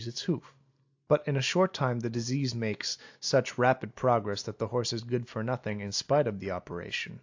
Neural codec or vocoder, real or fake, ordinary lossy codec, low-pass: none; real; AAC, 48 kbps; 7.2 kHz